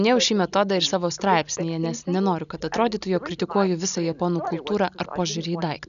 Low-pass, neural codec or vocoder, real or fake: 7.2 kHz; none; real